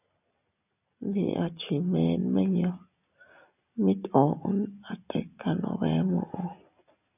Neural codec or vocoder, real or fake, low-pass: none; real; 3.6 kHz